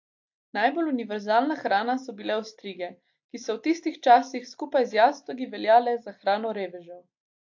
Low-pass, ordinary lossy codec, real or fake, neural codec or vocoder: 7.2 kHz; AAC, 48 kbps; real; none